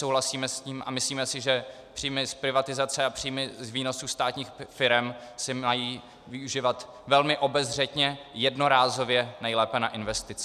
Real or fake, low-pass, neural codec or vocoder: real; 14.4 kHz; none